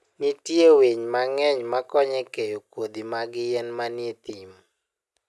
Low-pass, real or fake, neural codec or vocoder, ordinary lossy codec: none; real; none; none